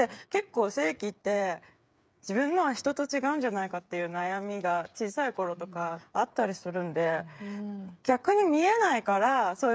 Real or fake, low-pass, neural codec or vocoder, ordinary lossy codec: fake; none; codec, 16 kHz, 8 kbps, FreqCodec, smaller model; none